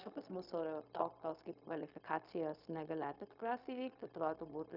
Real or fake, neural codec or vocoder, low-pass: fake; codec, 16 kHz, 0.4 kbps, LongCat-Audio-Codec; 5.4 kHz